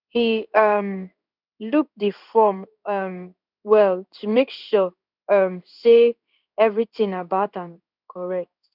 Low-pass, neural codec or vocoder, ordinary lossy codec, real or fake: 5.4 kHz; codec, 16 kHz in and 24 kHz out, 1 kbps, XY-Tokenizer; none; fake